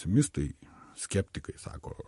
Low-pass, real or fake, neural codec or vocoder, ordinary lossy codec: 14.4 kHz; real; none; MP3, 48 kbps